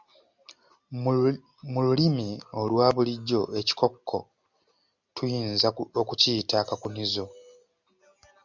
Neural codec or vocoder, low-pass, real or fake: none; 7.2 kHz; real